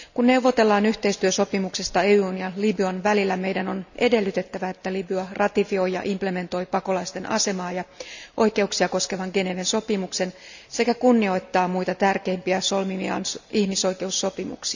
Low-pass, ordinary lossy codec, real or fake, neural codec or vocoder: 7.2 kHz; none; real; none